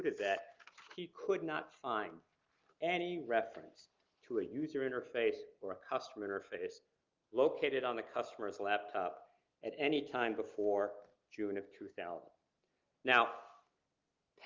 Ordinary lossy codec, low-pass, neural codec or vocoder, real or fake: Opus, 32 kbps; 7.2 kHz; none; real